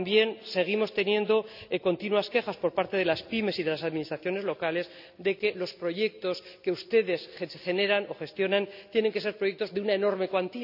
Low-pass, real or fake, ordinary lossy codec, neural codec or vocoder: 5.4 kHz; real; none; none